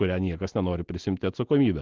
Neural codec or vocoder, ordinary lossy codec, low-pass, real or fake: none; Opus, 16 kbps; 7.2 kHz; real